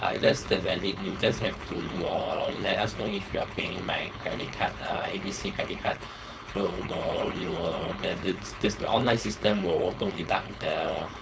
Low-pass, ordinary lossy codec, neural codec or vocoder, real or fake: none; none; codec, 16 kHz, 4.8 kbps, FACodec; fake